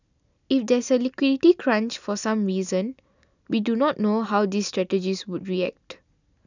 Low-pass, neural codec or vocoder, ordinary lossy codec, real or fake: 7.2 kHz; none; none; real